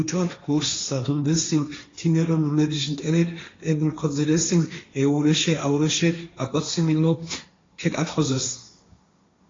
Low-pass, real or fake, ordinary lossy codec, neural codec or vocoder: 7.2 kHz; fake; AAC, 32 kbps; codec, 16 kHz, 1.1 kbps, Voila-Tokenizer